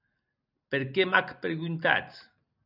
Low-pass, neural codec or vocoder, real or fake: 5.4 kHz; none; real